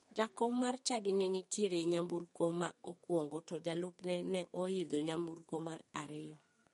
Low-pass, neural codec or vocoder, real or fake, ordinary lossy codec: 14.4 kHz; codec, 32 kHz, 1.9 kbps, SNAC; fake; MP3, 48 kbps